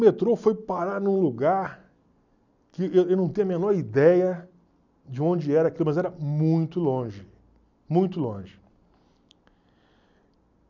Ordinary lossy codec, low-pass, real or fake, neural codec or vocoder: none; 7.2 kHz; real; none